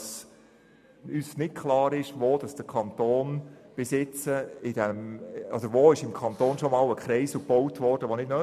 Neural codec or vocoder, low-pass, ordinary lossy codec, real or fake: none; 14.4 kHz; none; real